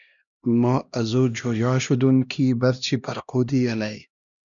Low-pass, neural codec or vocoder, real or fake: 7.2 kHz; codec, 16 kHz, 1 kbps, X-Codec, HuBERT features, trained on LibriSpeech; fake